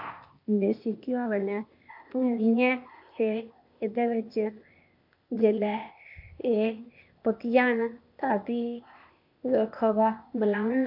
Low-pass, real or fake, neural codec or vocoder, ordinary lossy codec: 5.4 kHz; fake; codec, 16 kHz, 0.8 kbps, ZipCodec; MP3, 48 kbps